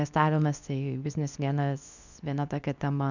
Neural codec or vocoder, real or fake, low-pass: codec, 24 kHz, 0.9 kbps, WavTokenizer, medium speech release version 2; fake; 7.2 kHz